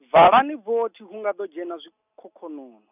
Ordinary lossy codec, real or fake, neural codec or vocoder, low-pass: none; real; none; 3.6 kHz